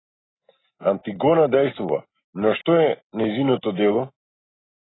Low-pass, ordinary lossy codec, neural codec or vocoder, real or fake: 7.2 kHz; AAC, 16 kbps; none; real